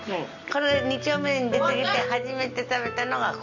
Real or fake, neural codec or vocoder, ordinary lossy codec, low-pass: real; none; none; 7.2 kHz